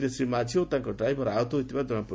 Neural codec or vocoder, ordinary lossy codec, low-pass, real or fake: none; none; none; real